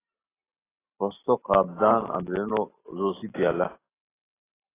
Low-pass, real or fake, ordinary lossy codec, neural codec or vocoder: 3.6 kHz; real; AAC, 16 kbps; none